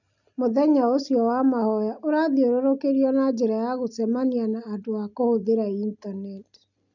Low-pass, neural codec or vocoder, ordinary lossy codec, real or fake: 7.2 kHz; none; none; real